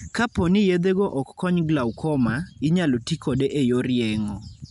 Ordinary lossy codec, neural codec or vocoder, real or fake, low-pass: none; none; real; 10.8 kHz